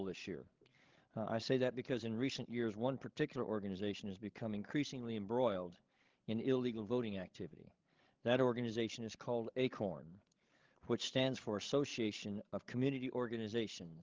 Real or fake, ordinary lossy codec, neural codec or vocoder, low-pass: fake; Opus, 16 kbps; codec, 16 kHz, 16 kbps, FreqCodec, larger model; 7.2 kHz